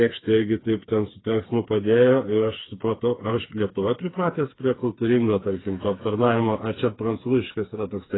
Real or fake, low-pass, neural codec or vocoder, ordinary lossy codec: fake; 7.2 kHz; codec, 16 kHz, 4 kbps, FreqCodec, smaller model; AAC, 16 kbps